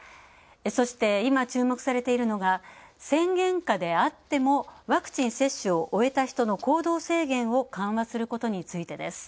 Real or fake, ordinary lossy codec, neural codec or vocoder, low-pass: real; none; none; none